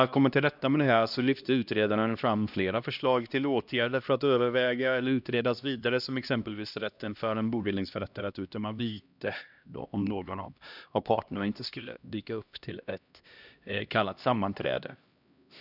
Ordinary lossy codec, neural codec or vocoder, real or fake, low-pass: none; codec, 16 kHz, 1 kbps, X-Codec, HuBERT features, trained on LibriSpeech; fake; 5.4 kHz